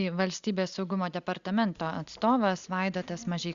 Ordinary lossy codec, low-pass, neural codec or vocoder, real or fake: Opus, 64 kbps; 7.2 kHz; none; real